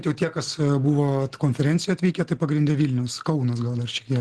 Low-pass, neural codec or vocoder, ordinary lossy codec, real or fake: 10.8 kHz; none; Opus, 16 kbps; real